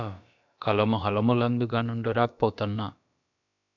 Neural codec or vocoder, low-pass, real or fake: codec, 16 kHz, about 1 kbps, DyCAST, with the encoder's durations; 7.2 kHz; fake